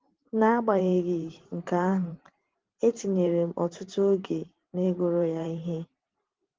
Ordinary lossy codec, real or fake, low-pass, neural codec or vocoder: Opus, 16 kbps; fake; 7.2 kHz; vocoder, 44.1 kHz, 128 mel bands every 512 samples, BigVGAN v2